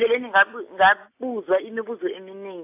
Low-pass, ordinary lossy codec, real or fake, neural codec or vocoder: 3.6 kHz; AAC, 32 kbps; real; none